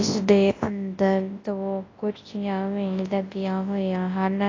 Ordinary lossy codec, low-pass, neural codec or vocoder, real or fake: AAC, 32 kbps; 7.2 kHz; codec, 24 kHz, 0.9 kbps, WavTokenizer, large speech release; fake